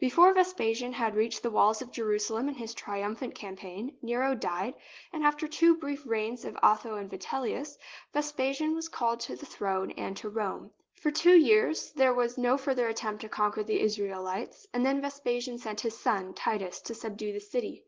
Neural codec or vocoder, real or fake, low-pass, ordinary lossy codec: none; real; 7.2 kHz; Opus, 16 kbps